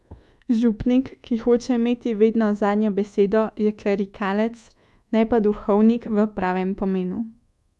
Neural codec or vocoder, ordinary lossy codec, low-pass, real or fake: codec, 24 kHz, 1.2 kbps, DualCodec; none; none; fake